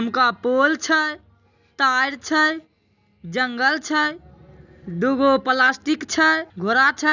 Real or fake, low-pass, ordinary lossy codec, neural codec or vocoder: real; 7.2 kHz; none; none